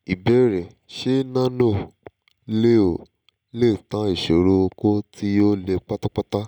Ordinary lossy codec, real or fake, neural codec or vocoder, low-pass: none; real; none; 19.8 kHz